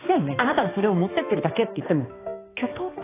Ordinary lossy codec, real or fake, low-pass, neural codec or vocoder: AAC, 16 kbps; fake; 3.6 kHz; codec, 16 kHz, 2 kbps, X-Codec, HuBERT features, trained on balanced general audio